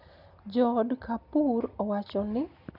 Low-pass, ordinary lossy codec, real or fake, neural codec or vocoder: 5.4 kHz; none; real; none